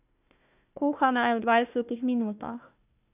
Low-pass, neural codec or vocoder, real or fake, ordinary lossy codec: 3.6 kHz; codec, 16 kHz, 1 kbps, FunCodec, trained on Chinese and English, 50 frames a second; fake; none